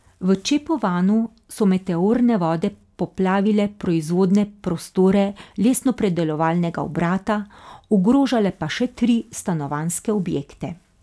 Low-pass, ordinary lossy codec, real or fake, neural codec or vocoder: none; none; real; none